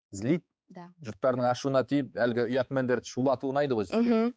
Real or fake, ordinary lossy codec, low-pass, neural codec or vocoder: fake; none; none; codec, 16 kHz, 4 kbps, X-Codec, HuBERT features, trained on balanced general audio